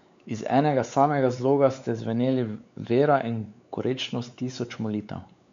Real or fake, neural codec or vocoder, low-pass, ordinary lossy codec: fake; codec, 16 kHz, 4 kbps, X-Codec, WavLM features, trained on Multilingual LibriSpeech; 7.2 kHz; MP3, 64 kbps